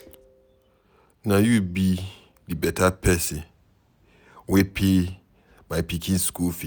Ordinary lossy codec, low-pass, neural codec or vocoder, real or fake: none; none; none; real